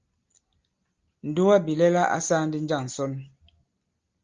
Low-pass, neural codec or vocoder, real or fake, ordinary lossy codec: 7.2 kHz; none; real; Opus, 32 kbps